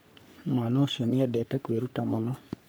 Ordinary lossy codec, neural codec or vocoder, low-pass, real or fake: none; codec, 44.1 kHz, 3.4 kbps, Pupu-Codec; none; fake